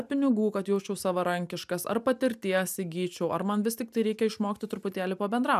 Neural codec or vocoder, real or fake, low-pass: none; real; 14.4 kHz